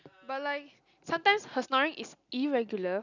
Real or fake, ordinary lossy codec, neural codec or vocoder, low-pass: real; none; none; 7.2 kHz